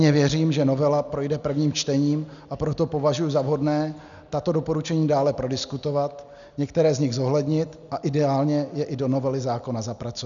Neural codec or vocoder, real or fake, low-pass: none; real; 7.2 kHz